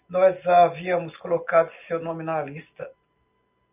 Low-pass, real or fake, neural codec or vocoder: 3.6 kHz; real; none